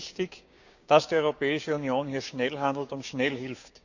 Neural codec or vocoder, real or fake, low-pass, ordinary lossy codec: codec, 16 kHz, 6 kbps, DAC; fake; 7.2 kHz; none